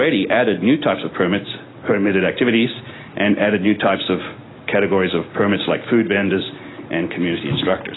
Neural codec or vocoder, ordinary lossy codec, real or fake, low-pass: none; AAC, 16 kbps; real; 7.2 kHz